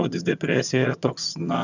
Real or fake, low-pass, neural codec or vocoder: fake; 7.2 kHz; vocoder, 22.05 kHz, 80 mel bands, HiFi-GAN